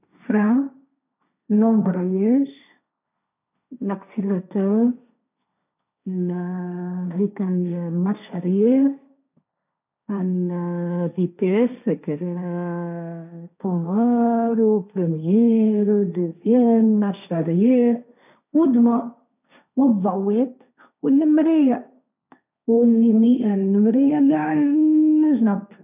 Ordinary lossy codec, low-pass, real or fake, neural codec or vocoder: none; 3.6 kHz; fake; codec, 16 kHz, 1.1 kbps, Voila-Tokenizer